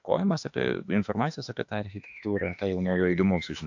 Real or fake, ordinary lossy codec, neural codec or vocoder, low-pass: fake; AAC, 48 kbps; autoencoder, 48 kHz, 32 numbers a frame, DAC-VAE, trained on Japanese speech; 7.2 kHz